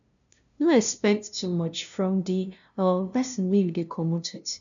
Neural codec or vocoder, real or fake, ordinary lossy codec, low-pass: codec, 16 kHz, 0.5 kbps, FunCodec, trained on LibriTTS, 25 frames a second; fake; none; 7.2 kHz